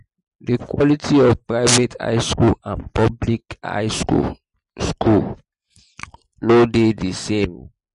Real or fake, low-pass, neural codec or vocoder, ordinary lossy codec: real; 14.4 kHz; none; MP3, 48 kbps